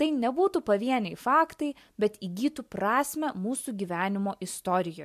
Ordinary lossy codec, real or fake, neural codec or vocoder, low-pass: MP3, 96 kbps; real; none; 14.4 kHz